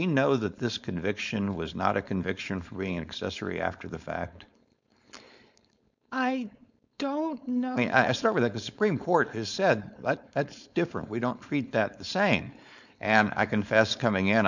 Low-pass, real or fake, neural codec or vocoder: 7.2 kHz; fake; codec, 16 kHz, 4.8 kbps, FACodec